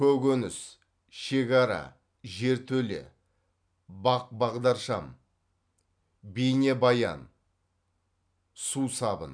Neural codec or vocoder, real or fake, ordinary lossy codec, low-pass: none; real; none; 9.9 kHz